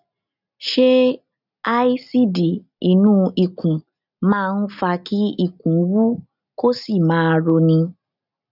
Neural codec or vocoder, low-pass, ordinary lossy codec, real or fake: none; 5.4 kHz; none; real